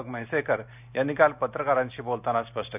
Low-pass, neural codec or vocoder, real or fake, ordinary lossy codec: 3.6 kHz; none; real; none